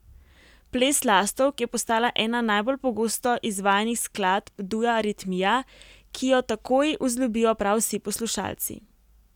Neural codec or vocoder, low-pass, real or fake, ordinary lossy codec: none; 19.8 kHz; real; none